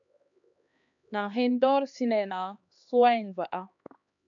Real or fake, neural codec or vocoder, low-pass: fake; codec, 16 kHz, 2 kbps, X-Codec, HuBERT features, trained on LibriSpeech; 7.2 kHz